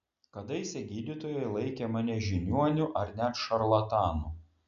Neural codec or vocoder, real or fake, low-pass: none; real; 7.2 kHz